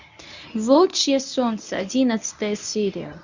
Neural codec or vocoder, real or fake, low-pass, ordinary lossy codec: codec, 24 kHz, 0.9 kbps, WavTokenizer, medium speech release version 1; fake; 7.2 kHz; none